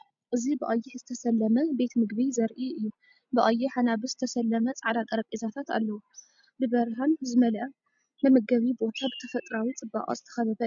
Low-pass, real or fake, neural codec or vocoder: 7.2 kHz; real; none